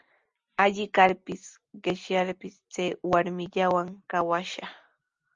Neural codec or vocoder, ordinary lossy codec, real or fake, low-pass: none; Opus, 32 kbps; real; 7.2 kHz